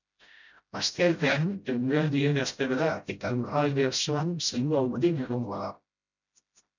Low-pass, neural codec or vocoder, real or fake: 7.2 kHz; codec, 16 kHz, 0.5 kbps, FreqCodec, smaller model; fake